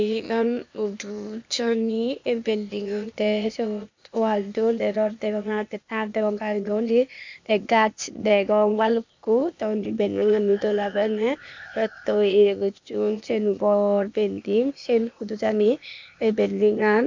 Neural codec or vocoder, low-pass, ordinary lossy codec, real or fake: codec, 16 kHz, 0.8 kbps, ZipCodec; 7.2 kHz; MP3, 64 kbps; fake